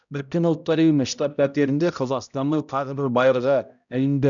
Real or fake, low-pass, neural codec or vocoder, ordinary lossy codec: fake; 7.2 kHz; codec, 16 kHz, 0.5 kbps, X-Codec, HuBERT features, trained on balanced general audio; none